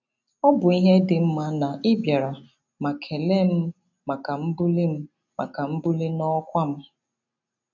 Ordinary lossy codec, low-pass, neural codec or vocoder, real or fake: none; 7.2 kHz; none; real